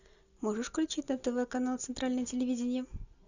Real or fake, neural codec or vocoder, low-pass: real; none; 7.2 kHz